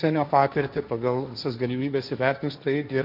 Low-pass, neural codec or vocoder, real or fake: 5.4 kHz; codec, 16 kHz, 1.1 kbps, Voila-Tokenizer; fake